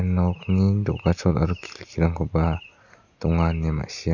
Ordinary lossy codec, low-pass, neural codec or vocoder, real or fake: none; 7.2 kHz; none; real